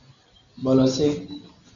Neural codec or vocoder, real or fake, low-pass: none; real; 7.2 kHz